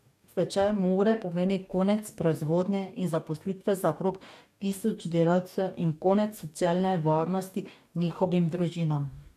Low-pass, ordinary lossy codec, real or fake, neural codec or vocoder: 14.4 kHz; none; fake; codec, 44.1 kHz, 2.6 kbps, DAC